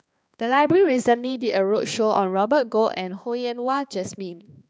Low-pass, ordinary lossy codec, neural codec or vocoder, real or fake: none; none; codec, 16 kHz, 2 kbps, X-Codec, HuBERT features, trained on balanced general audio; fake